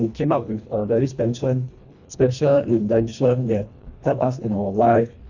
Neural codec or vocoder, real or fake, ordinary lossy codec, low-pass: codec, 24 kHz, 1.5 kbps, HILCodec; fake; none; 7.2 kHz